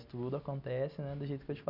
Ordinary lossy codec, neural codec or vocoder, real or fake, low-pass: none; none; real; 5.4 kHz